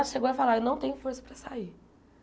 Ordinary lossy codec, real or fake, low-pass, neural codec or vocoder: none; real; none; none